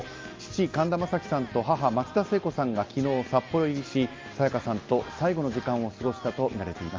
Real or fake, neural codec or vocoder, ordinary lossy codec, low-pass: real; none; Opus, 24 kbps; 7.2 kHz